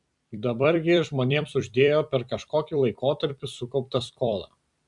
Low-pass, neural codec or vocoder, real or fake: 10.8 kHz; vocoder, 44.1 kHz, 128 mel bands every 256 samples, BigVGAN v2; fake